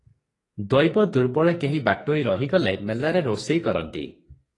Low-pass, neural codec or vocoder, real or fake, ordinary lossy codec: 10.8 kHz; codec, 44.1 kHz, 2.6 kbps, SNAC; fake; AAC, 32 kbps